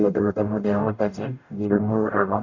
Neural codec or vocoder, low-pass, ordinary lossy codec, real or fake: codec, 44.1 kHz, 0.9 kbps, DAC; 7.2 kHz; none; fake